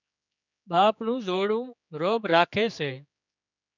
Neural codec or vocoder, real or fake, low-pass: codec, 16 kHz, 4 kbps, X-Codec, HuBERT features, trained on general audio; fake; 7.2 kHz